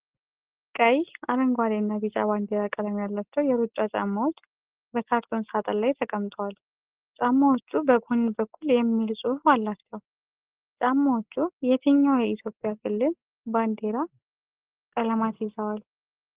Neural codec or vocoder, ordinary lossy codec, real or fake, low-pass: none; Opus, 16 kbps; real; 3.6 kHz